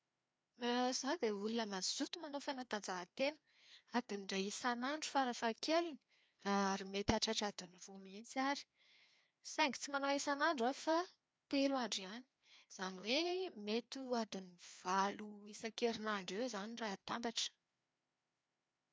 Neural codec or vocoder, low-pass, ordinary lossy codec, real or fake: codec, 16 kHz, 2 kbps, FreqCodec, larger model; none; none; fake